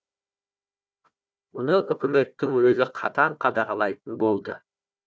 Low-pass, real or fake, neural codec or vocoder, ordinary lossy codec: none; fake; codec, 16 kHz, 1 kbps, FunCodec, trained on Chinese and English, 50 frames a second; none